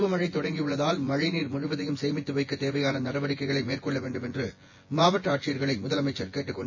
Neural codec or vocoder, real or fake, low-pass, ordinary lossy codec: vocoder, 24 kHz, 100 mel bands, Vocos; fake; 7.2 kHz; MP3, 32 kbps